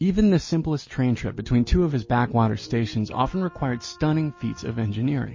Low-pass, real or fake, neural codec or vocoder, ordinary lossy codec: 7.2 kHz; real; none; MP3, 32 kbps